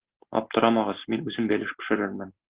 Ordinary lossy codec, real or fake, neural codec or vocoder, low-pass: Opus, 16 kbps; real; none; 3.6 kHz